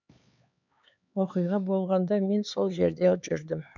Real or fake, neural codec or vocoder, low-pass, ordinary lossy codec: fake; codec, 16 kHz, 4 kbps, X-Codec, HuBERT features, trained on LibriSpeech; 7.2 kHz; none